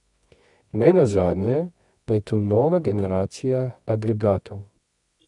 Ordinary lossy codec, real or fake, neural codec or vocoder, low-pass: MP3, 96 kbps; fake; codec, 24 kHz, 0.9 kbps, WavTokenizer, medium music audio release; 10.8 kHz